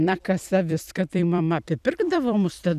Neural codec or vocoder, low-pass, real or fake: vocoder, 44.1 kHz, 128 mel bands every 256 samples, BigVGAN v2; 14.4 kHz; fake